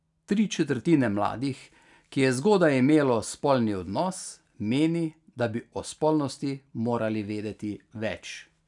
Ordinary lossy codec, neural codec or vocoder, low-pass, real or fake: none; none; 10.8 kHz; real